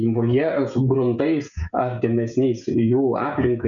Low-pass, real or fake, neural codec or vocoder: 7.2 kHz; fake; codec, 16 kHz, 16 kbps, FreqCodec, smaller model